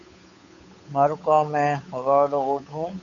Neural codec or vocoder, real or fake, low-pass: codec, 16 kHz, 8 kbps, FunCodec, trained on Chinese and English, 25 frames a second; fake; 7.2 kHz